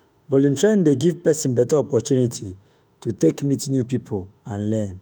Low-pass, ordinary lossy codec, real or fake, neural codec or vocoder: none; none; fake; autoencoder, 48 kHz, 32 numbers a frame, DAC-VAE, trained on Japanese speech